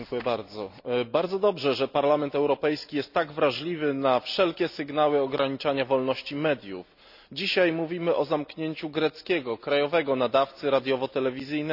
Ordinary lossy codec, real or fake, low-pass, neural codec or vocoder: none; real; 5.4 kHz; none